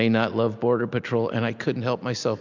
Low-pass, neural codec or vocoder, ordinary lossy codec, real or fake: 7.2 kHz; none; MP3, 64 kbps; real